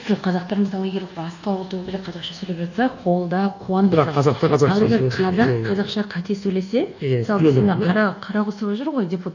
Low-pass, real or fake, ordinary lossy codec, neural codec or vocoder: 7.2 kHz; fake; none; codec, 24 kHz, 1.2 kbps, DualCodec